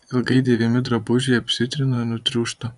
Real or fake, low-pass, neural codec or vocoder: fake; 10.8 kHz; vocoder, 24 kHz, 100 mel bands, Vocos